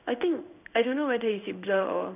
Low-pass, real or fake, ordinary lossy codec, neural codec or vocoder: 3.6 kHz; fake; none; codec, 16 kHz in and 24 kHz out, 1 kbps, XY-Tokenizer